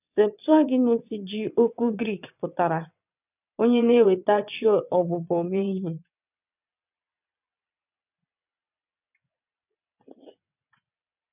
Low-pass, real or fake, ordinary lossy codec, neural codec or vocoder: 3.6 kHz; fake; none; vocoder, 22.05 kHz, 80 mel bands, WaveNeXt